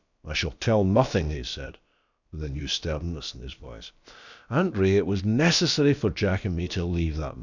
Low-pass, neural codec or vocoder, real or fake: 7.2 kHz; codec, 16 kHz, about 1 kbps, DyCAST, with the encoder's durations; fake